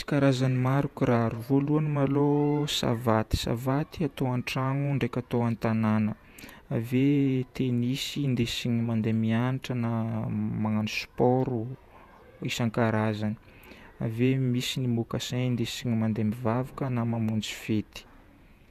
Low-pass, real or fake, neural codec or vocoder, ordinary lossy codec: 14.4 kHz; fake; vocoder, 48 kHz, 128 mel bands, Vocos; none